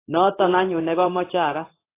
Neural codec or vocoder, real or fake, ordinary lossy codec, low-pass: codec, 16 kHz in and 24 kHz out, 1 kbps, XY-Tokenizer; fake; AAC, 24 kbps; 3.6 kHz